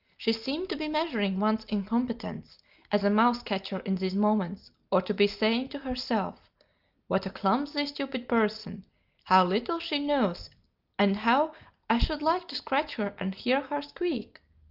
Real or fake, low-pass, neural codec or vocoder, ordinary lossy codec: real; 5.4 kHz; none; Opus, 24 kbps